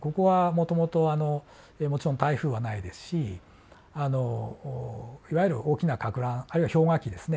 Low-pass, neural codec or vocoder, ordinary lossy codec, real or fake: none; none; none; real